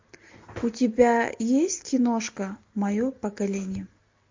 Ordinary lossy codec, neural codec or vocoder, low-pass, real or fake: MP3, 48 kbps; none; 7.2 kHz; real